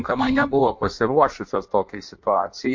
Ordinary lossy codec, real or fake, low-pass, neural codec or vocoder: MP3, 48 kbps; fake; 7.2 kHz; codec, 16 kHz in and 24 kHz out, 1.1 kbps, FireRedTTS-2 codec